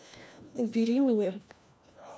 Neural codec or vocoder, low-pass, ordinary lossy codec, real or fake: codec, 16 kHz, 1 kbps, FunCodec, trained on LibriTTS, 50 frames a second; none; none; fake